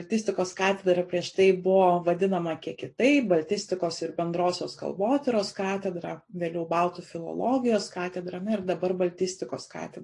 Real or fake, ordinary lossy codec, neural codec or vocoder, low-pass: real; AAC, 48 kbps; none; 10.8 kHz